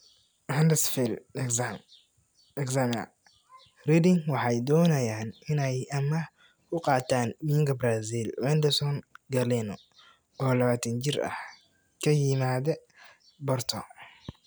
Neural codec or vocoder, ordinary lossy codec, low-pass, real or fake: none; none; none; real